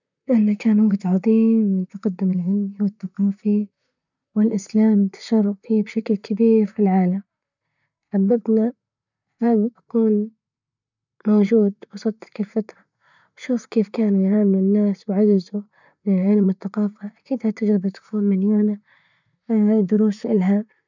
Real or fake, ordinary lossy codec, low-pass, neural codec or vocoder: fake; none; 7.2 kHz; vocoder, 44.1 kHz, 128 mel bands, Pupu-Vocoder